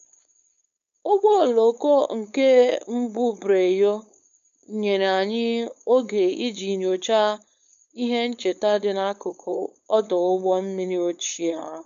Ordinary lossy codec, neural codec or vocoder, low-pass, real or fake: AAC, 96 kbps; codec, 16 kHz, 4.8 kbps, FACodec; 7.2 kHz; fake